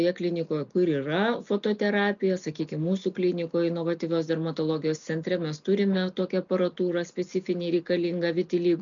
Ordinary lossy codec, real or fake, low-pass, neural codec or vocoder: MP3, 96 kbps; real; 7.2 kHz; none